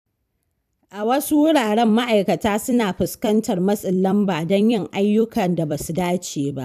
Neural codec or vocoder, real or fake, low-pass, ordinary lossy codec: vocoder, 44.1 kHz, 128 mel bands every 256 samples, BigVGAN v2; fake; 14.4 kHz; none